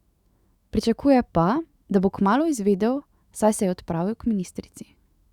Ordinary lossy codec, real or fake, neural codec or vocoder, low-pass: Opus, 64 kbps; fake; autoencoder, 48 kHz, 128 numbers a frame, DAC-VAE, trained on Japanese speech; 19.8 kHz